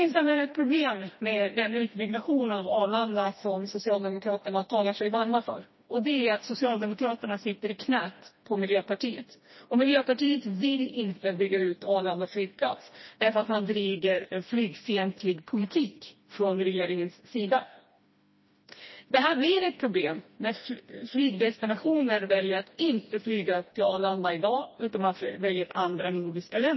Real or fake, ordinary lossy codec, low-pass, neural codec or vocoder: fake; MP3, 24 kbps; 7.2 kHz; codec, 16 kHz, 1 kbps, FreqCodec, smaller model